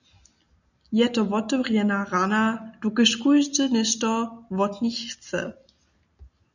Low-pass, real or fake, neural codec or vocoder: 7.2 kHz; real; none